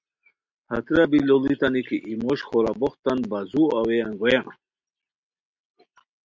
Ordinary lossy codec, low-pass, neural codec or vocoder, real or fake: MP3, 64 kbps; 7.2 kHz; none; real